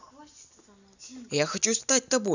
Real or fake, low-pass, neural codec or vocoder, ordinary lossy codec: real; 7.2 kHz; none; none